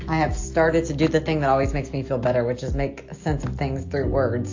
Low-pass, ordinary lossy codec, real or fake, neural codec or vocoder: 7.2 kHz; AAC, 48 kbps; real; none